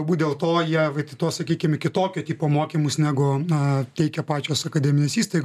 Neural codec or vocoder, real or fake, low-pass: none; real; 14.4 kHz